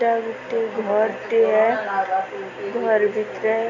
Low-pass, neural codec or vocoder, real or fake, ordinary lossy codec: 7.2 kHz; none; real; none